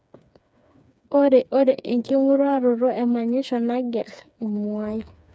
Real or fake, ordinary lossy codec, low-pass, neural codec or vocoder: fake; none; none; codec, 16 kHz, 4 kbps, FreqCodec, smaller model